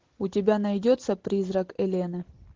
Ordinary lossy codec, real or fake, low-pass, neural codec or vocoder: Opus, 16 kbps; real; 7.2 kHz; none